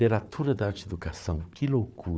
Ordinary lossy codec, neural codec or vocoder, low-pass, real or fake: none; codec, 16 kHz, 4 kbps, FunCodec, trained on Chinese and English, 50 frames a second; none; fake